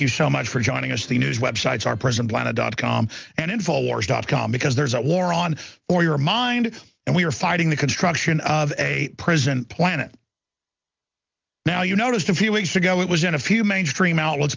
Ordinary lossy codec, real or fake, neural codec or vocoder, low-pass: Opus, 24 kbps; real; none; 7.2 kHz